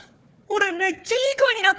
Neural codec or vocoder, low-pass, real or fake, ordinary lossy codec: codec, 16 kHz, 4 kbps, FunCodec, trained on Chinese and English, 50 frames a second; none; fake; none